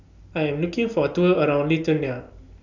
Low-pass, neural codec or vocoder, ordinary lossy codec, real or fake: 7.2 kHz; none; none; real